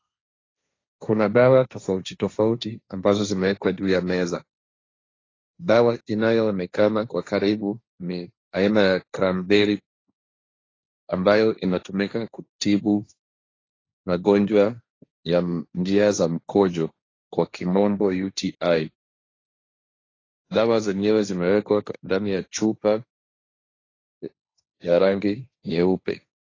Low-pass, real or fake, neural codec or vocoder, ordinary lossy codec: 7.2 kHz; fake; codec, 16 kHz, 1.1 kbps, Voila-Tokenizer; AAC, 32 kbps